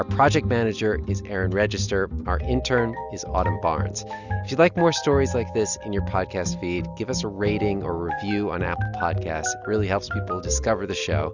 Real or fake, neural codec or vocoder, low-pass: real; none; 7.2 kHz